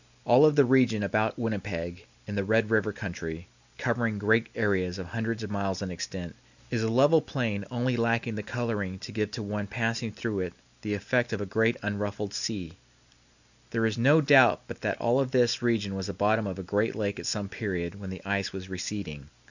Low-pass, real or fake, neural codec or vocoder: 7.2 kHz; real; none